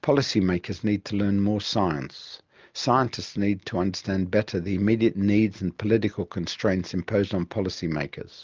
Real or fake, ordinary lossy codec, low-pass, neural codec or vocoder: real; Opus, 24 kbps; 7.2 kHz; none